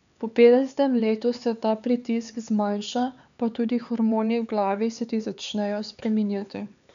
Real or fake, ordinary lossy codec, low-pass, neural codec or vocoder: fake; none; 7.2 kHz; codec, 16 kHz, 4 kbps, X-Codec, HuBERT features, trained on LibriSpeech